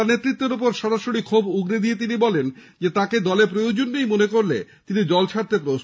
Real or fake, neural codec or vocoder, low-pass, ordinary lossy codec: real; none; none; none